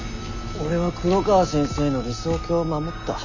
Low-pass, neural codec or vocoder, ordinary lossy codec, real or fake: 7.2 kHz; none; none; real